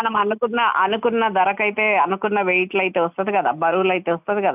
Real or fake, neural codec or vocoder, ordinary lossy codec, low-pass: real; none; none; 3.6 kHz